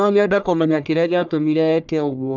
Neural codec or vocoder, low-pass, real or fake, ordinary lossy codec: codec, 44.1 kHz, 1.7 kbps, Pupu-Codec; 7.2 kHz; fake; none